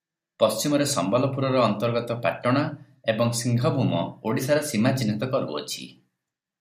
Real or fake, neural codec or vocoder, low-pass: real; none; 14.4 kHz